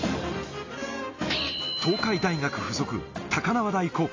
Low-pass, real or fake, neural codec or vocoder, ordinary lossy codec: 7.2 kHz; real; none; AAC, 32 kbps